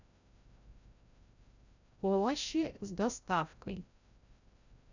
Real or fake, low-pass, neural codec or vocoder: fake; 7.2 kHz; codec, 16 kHz, 0.5 kbps, FreqCodec, larger model